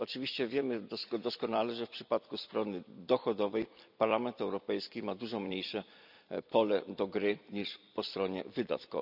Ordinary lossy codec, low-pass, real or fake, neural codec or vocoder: none; 5.4 kHz; fake; vocoder, 44.1 kHz, 128 mel bands every 256 samples, BigVGAN v2